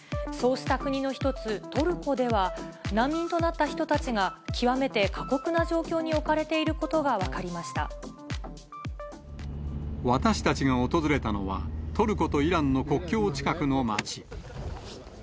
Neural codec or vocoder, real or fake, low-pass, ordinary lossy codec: none; real; none; none